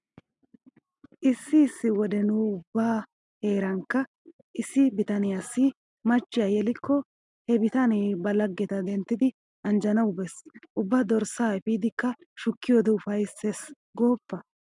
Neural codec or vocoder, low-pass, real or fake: none; 10.8 kHz; real